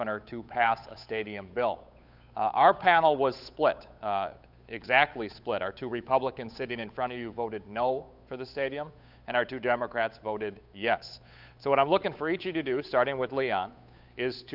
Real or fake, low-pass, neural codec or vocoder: fake; 5.4 kHz; codec, 16 kHz, 8 kbps, FunCodec, trained on Chinese and English, 25 frames a second